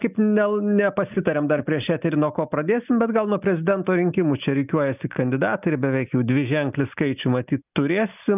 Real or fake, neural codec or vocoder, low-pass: real; none; 3.6 kHz